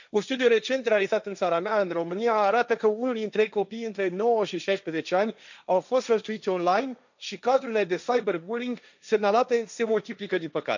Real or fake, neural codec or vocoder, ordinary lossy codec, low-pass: fake; codec, 16 kHz, 1.1 kbps, Voila-Tokenizer; none; 7.2 kHz